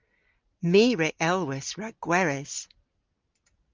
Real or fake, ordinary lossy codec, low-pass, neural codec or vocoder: real; Opus, 32 kbps; 7.2 kHz; none